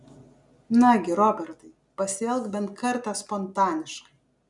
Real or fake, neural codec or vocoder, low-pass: real; none; 10.8 kHz